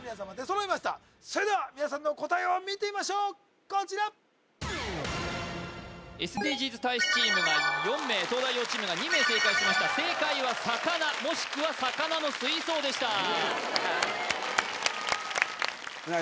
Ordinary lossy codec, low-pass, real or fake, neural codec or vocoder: none; none; real; none